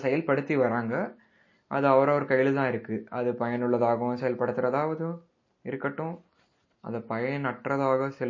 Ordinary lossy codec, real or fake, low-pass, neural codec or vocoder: MP3, 32 kbps; real; 7.2 kHz; none